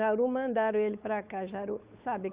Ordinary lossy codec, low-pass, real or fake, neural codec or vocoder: Opus, 64 kbps; 3.6 kHz; fake; codec, 16 kHz, 8 kbps, FunCodec, trained on Chinese and English, 25 frames a second